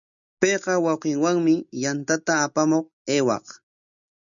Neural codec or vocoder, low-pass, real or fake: none; 7.2 kHz; real